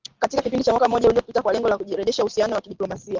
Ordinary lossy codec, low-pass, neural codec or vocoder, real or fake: Opus, 16 kbps; 7.2 kHz; none; real